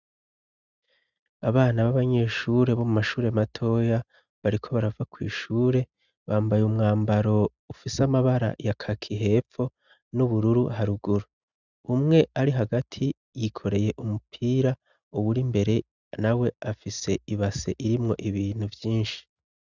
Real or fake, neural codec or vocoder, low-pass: real; none; 7.2 kHz